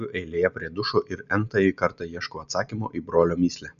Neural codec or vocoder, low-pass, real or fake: none; 7.2 kHz; real